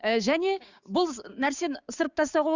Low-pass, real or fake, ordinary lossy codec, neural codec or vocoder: 7.2 kHz; fake; Opus, 64 kbps; codec, 16 kHz, 6 kbps, DAC